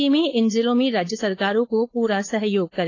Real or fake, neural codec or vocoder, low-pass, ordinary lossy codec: fake; codec, 16 kHz, 4.8 kbps, FACodec; 7.2 kHz; AAC, 32 kbps